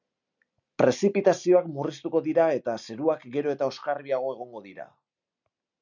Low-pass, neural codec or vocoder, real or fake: 7.2 kHz; none; real